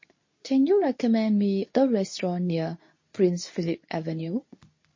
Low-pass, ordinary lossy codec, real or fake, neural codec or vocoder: 7.2 kHz; MP3, 32 kbps; fake; codec, 24 kHz, 0.9 kbps, WavTokenizer, medium speech release version 2